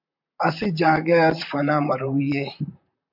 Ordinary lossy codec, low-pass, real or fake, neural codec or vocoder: MP3, 48 kbps; 5.4 kHz; fake; vocoder, 44.1 kHz, 128 mel bands, Pupu-Vocoder